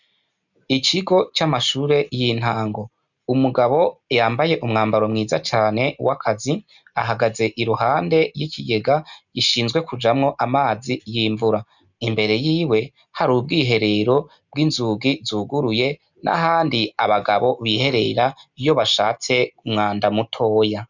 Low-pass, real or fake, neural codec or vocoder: 7.2 kHz; real; none